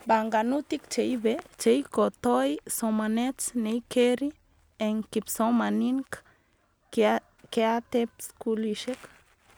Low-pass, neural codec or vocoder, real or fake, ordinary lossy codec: none; none; real; none